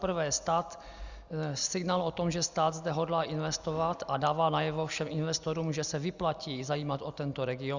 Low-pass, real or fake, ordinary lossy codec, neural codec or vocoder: 7.2 kHz; real; Opus, 64 kbps; none